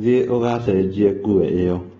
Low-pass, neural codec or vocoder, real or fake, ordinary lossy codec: 7.2 kHz; none; real; AAC, 24 kbps